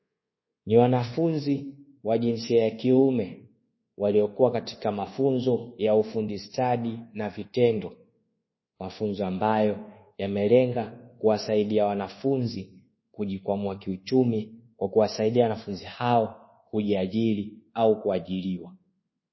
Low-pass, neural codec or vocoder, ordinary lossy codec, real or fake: 7.2 kHz; codec, 24 kHz, 1.2 kbps, DualCodec; MP3, 24 kbps; fake